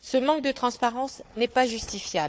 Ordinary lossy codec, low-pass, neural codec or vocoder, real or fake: none; none; codec, 16 kHz, 4 kbps, FunCodec, trained on Chinese and English, 50 frames a second; fake